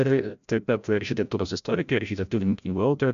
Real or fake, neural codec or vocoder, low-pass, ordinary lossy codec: fake; codec, 16 kHz, 0.5 kbps, FreqCodec, larger model; 7.2 kHz; AAC, 96 kbps